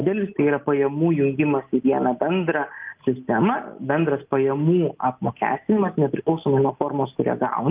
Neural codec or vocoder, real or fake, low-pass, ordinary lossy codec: none; real; 3.6 kHz; Opus, 32 kbps